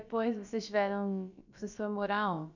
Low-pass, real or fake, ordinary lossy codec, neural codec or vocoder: 7.2 kHz; fake; none; codec, 16 kHz, about 1 kbps, DyCAST, with the encoder's durations